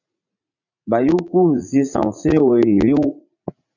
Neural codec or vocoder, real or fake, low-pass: vocoder, 44.1 kHz, 80 mel bands, Vocos; fake; 7.2 kHz